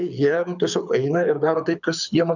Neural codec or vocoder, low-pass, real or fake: codec, 24 kHz, 6 kbps, HILCodec; 7.2 kHz; fake